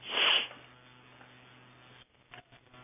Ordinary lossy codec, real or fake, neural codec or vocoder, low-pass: none; real; none; 3.6 kHz